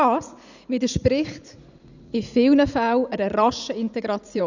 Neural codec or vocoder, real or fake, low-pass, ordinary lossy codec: vocoder, 44.1 kHz, 80 mel bands, Vocos; fake; 7.2 kHz; none